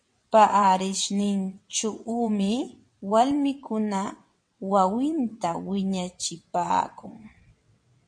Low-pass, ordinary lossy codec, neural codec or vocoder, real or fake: 9.9 kHz; MP3, 48 kbps; vocoder, 22.05 kHz, 80 mel bands, WaveNeXt; fake